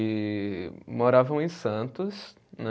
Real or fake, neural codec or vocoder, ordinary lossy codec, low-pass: real; none; none; none